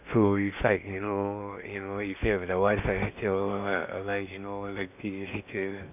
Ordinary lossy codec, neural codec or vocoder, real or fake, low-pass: none; codec, 16 kHz in and 24 kHz out, 0.8 kbps, FocalCodec, streaming, 65536 codes; fake; 3.6 kHz